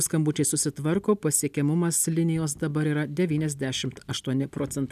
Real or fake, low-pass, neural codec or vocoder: real; 14.4 kHz; none